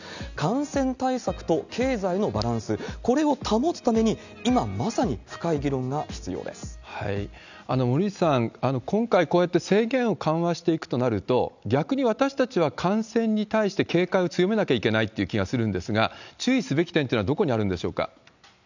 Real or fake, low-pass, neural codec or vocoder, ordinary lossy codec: real; 7.2 kHz; none; none